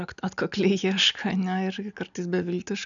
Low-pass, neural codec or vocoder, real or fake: 7.2 kHz; none; real